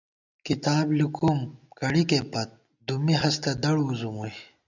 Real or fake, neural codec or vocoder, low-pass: real; none; 7.2 kHz